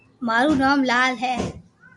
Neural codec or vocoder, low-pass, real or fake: none; 10.8 kHz; real